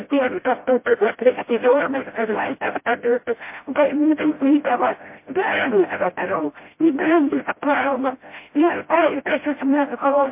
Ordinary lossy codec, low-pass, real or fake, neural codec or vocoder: MP3, 24 kbps; 3.6 kHz; fake; codec, 16 kHz, 0.5 kbps, FreqCodec, smaller model